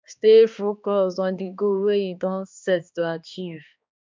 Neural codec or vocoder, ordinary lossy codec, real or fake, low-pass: codec, 16 kHz, 2 kbps, X-Codec, HuBERT features, trained on balanced general audio; MP3, 64 kbps; fake; 7.2 kHz